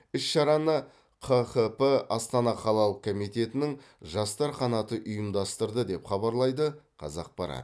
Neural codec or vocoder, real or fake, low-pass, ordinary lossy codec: none; real; none; none